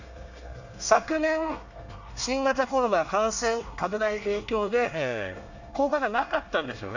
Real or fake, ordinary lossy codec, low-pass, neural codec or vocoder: fake; none; 7.2 kHz; codec, 24 kHz, 1 kbps, SNAC